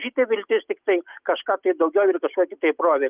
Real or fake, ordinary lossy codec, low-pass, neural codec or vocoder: real; Opus, 24 kbps; 3.6 kHz; none